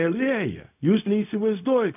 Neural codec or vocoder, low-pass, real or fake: codec, 16 kHz, 0.4 kbps, LongCat-Audio-Codec; 3.6 kHz; fake